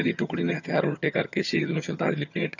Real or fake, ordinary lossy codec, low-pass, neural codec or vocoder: fake; none; 7.2 kHz; vocoder, 22.05 kHz, 80 mel bands, HiFi-GAN